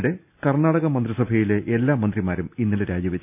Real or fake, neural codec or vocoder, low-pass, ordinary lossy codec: real; none; 3.6 kHz; none